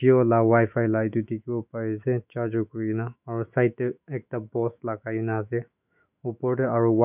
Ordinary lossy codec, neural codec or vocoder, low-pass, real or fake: none; autoencoder, 48 kHz, 128 numbers a frame, DAC-VAE, trained on Japanese speech; 3.6 kHz; fake